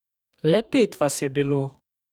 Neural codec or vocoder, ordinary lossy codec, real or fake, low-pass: codec, 44.1 kHz, 2.6 kbps, DAC; none; fake; 19.8 kHz